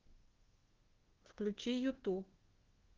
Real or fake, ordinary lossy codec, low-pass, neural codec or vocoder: fake; Opus, 16 kbps; 7.2 kHz; codec, 24 kHz, 1.2 kbps, DualCodec